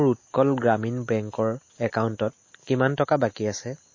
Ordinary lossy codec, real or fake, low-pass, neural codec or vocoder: MP3, 32 kbps; real; 7.2 kHz; none